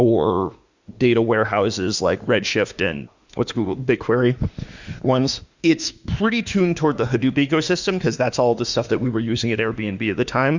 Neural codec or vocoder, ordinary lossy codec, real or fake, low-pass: autoencoder, 48 kHz, 32 numbers a frame, DAC-VAE, trained on Japanese speech; Opus, 64 kbps; fake; 7.2 kHz